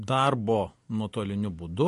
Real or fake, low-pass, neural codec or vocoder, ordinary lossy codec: real; 14.4 kHz; none; MP3, 48 kbps